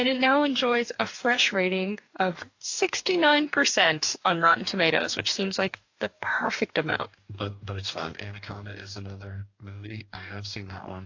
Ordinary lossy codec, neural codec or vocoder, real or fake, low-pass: AAC, 48 kbps; codec, 44.1 kHz, 2.6 kbps, DAC; fake; 7.2 kHz